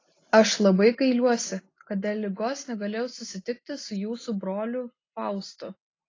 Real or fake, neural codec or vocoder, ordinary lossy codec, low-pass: real; none; AAC, 32 kbps; 7.2 kHz